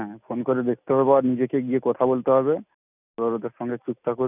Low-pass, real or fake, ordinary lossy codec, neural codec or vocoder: 3.6 kHz; real; none; none